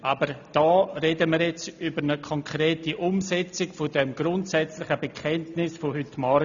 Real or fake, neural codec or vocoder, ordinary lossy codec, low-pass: real; none; none; 7.2 kHz